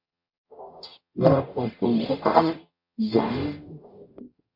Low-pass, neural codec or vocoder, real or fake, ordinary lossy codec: 5.4 kHz; codec, 44.1 kHz, 0.9 kbps, DAC; fake; MP3, 32 kbps